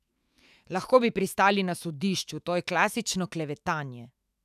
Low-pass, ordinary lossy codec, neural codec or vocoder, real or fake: 14.4 kHz; none; autoencoder, 48 kHz, 128 numbers a frame, DAC-VAE, trained on Japanese speech; fake